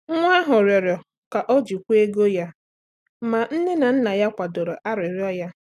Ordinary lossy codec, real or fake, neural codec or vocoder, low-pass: none; real; none; 14.4 kHz